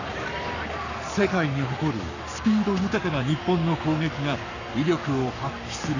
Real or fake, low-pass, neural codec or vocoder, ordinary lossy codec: fake; 7.2 kHz; codec, 44.1 kHz, 7.8 kbps, Pupu-Codec; none